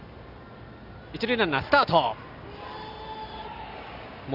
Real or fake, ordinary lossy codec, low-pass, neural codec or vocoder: real; none; 5.4 kHz; none